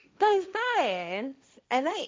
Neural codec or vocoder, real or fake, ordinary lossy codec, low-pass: codec, 16 kHz, 1.1 kbps, Voila-Tokenizer; fake; none; none